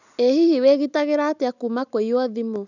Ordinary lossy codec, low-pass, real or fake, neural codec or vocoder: none; 7.2 kHz; real; none